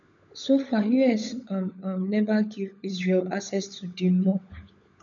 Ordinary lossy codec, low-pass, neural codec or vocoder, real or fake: none; 7.2 kHz; codec, 16 kHz, 16 kbps, FunCodec, trained on LibriTTS, 50 frames a second; fake